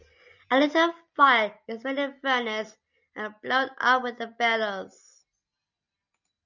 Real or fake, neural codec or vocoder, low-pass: real; none; 7.2 kHz